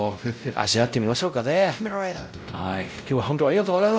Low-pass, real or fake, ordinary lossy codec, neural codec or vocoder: none; fake; none; codec, 16 kHz, 0.5 kbps, X-Codec, WavLM features, trained on Multilingual LibriSpeech